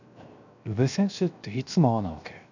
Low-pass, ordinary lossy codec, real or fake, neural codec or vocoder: 7.2 kHz; none; fake; codec, 16 kHz, 0.7 kbps, FocalCodec